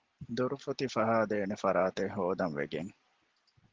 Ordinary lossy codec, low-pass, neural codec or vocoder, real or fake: Opus, 16 kbps; 7.2 kHz; none; real